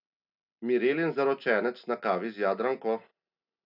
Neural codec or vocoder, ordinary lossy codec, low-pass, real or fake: none; none; 5.4 kHz; real